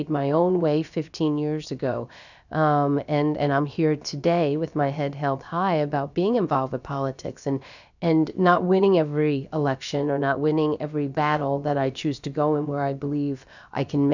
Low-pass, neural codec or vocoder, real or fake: 7.2 kHz; codec, 16 kHz, about 1 kbps, DyCAST, with the encoder's durations; fake